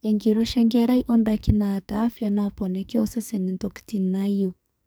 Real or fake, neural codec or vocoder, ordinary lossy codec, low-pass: fake; codec, 44.1 kHz, 2.6 kbps, SNAC; none; none